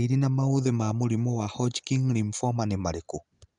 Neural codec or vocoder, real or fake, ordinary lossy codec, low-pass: none; real; none; 9.9 kHz